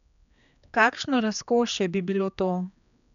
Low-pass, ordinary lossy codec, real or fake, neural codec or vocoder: 7.2 kHz; none; fake; codec, 16 kHz, 2 kbps, X-Codec, HuBERT features, trained on general audio